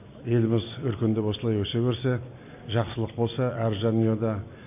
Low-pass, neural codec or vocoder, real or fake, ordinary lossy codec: 3.6 kHz; none; real; MP3, 32 kbps